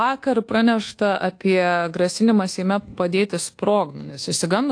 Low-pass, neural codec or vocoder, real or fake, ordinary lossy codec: 9.9 kHz; codec, 24 kHz, 1.2 kbps, DualCodec; fake; AAC, 48 kbps